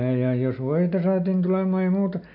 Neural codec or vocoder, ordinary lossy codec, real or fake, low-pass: none; none; real; 5.4 kHz